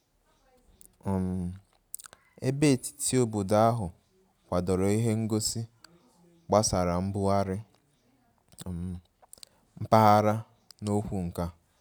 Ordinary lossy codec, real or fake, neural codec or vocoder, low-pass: none; real; none; none